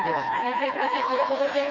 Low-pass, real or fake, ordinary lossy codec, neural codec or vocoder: 7.2 kHz; fake; none; codec, 16 kHz, 2 kbps, FreqCodec, smaller model